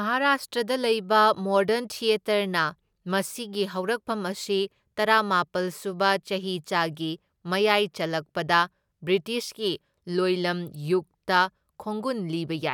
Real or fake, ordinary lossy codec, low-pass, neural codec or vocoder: real; none; 19.8 kHz; none